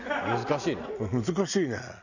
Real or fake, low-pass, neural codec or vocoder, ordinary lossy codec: real; 7.2 kHz; none; none